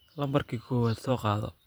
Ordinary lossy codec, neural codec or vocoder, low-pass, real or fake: none; vocoder, 44.1 kHz, 128 mel bands every 512 samples, BigVGAN v2; none; fake